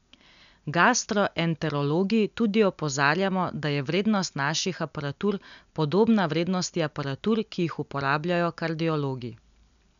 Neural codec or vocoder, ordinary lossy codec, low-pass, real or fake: none; none; 7.2 kHz; real